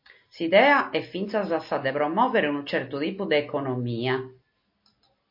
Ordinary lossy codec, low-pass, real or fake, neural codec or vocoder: MP3, 32 kbps; 5.4 kHz; real; none